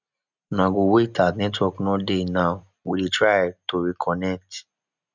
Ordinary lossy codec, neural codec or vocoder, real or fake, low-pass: none; none; real; 7.2 kHz